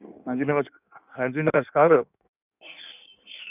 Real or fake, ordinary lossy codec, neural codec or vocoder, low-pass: fake; none; codec, 16 kHz in and 24 kHz out, 1.1 kbps, FireRedTTS-2 codec; 3.6 kHz